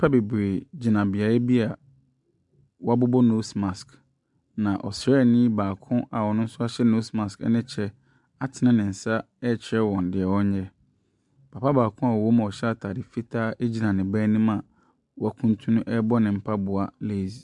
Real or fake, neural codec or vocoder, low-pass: real; none; 10.8 kHz